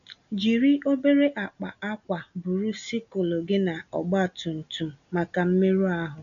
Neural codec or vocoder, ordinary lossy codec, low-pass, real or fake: none; none; 7.2 kHz; real